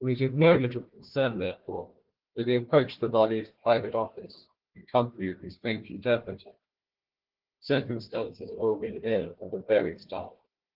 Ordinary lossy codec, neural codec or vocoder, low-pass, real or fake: Opus, 16 kbps; codec, 16 kHz, 1 kbps, FunCodec, trained on Chinese and English, 50 frames a second; 5.4 kHz; fake